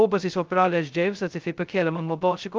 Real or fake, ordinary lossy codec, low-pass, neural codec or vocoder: fake; Opus, 24 kbps; 7.2 kHz; codec, 16 kHz, 0.2 kbps, FocalCodec